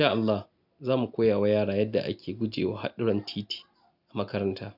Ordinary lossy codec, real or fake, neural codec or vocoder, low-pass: none; real; none; 5.4 kHz